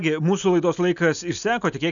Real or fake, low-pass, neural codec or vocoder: real; 7.2 kHz; none